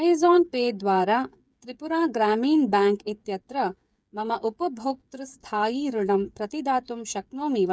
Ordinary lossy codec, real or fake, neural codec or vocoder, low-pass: none; fake; codec, 16 kHz, 8 kbps, FreqCodec, smaller model; none